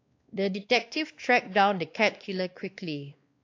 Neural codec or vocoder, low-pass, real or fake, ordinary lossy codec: codec, 16 kHz, 2 kbps, X-Codec, WavLM features, trained on Multilingual LibriSpeech; 7.2 kHz; fake; AAC, 48 kbps